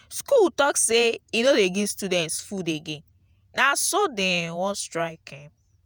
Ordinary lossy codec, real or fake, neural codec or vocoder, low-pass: none; fake; vocoder, 48 kHz, 128 mel bands, Vocos; none